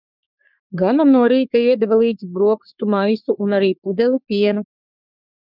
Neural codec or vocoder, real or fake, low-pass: codec, 44.1 kHz, 3.4 kbps, Pupu-Codec; fake; 5.4 kHz